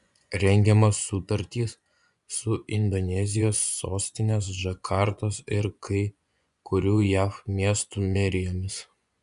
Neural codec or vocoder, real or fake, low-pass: vocoder, 24 kHz, 100 mel bands, Vocos; fake; 10.8 kHz